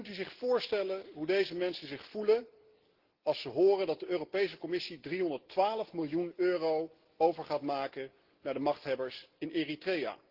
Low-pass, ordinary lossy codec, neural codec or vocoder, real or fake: 5.4 kHz; Opus, 16 kbps; none; real